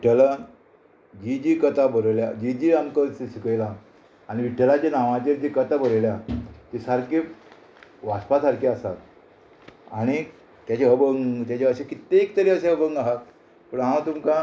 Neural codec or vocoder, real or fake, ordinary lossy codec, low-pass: none; real; none; none